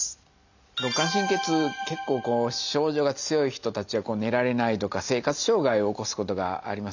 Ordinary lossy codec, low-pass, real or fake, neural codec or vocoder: none; 7.2 kHz; real; none